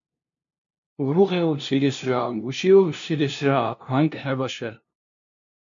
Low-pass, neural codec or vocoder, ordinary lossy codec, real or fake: 7.2 kHz; codec, 16 kHz, 0.5 kbps, FunCodec, trained on LibriTTS, 25 frames a second; MP3, 64 kbps; fake